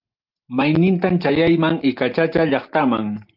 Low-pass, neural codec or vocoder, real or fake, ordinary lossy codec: 5.4 kHz; none; real; Opus, 32 kbps